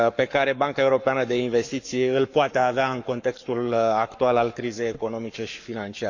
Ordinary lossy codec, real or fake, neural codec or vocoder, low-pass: none; fake; codec, 44.1 kHz, 7.8 kbps, Pupu-Codec; 7.2 kHz